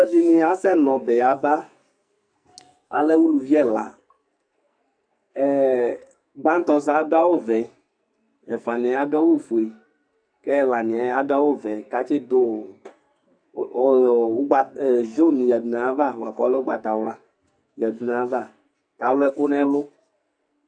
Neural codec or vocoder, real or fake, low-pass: codec, 44.1 kHz, 2.6 kbps, SNAC; fake; 9.9 kHz